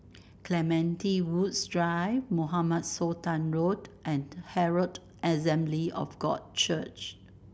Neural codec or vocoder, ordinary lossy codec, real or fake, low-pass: none; none; real; none